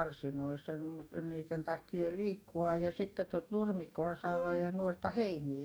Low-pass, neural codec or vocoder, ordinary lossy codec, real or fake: none; codec, 44.1 kHz, 2.6 kbps, DAC; none; fake